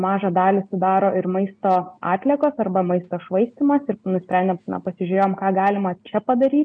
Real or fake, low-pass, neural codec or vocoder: real; 9.9 kHz; none